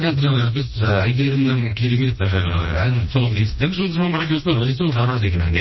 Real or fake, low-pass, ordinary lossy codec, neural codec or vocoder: fake; 7.2 kHz; MP3, 24 kbps; codec, 16 kHz, 1 kbps, FreqCodec, smaller model